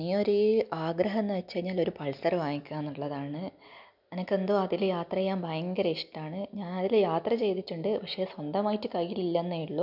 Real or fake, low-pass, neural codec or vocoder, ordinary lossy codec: real; 5.4 kHz; none; none